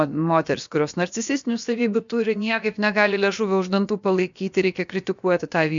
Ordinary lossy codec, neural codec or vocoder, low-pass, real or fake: MP3, 64 kbps; codec, 16 kHz, about 1 kbps, DyCAST, with the encoder's durations; 7.2 kHz; fake